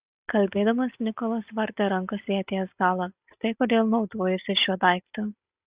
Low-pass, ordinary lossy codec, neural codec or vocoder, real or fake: 3.6 kHz; Opus, 64 kbps; none; real